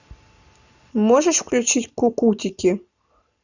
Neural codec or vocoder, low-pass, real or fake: none; 7.2 kHz; real